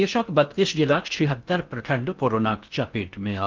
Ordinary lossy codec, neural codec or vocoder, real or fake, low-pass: Opus, 24 kbps; codec, 16 kHz in and 24 kHz out, 0.6 kbps, FocalCodec, streaming, 4096 codes; fake; 7.2 kHz